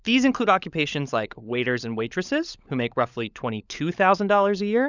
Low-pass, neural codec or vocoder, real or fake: 7.2 kHz; codec, 16 kHz, 16 kbps, FunCodec, trained on LibriTTS, 50 frames a second; fake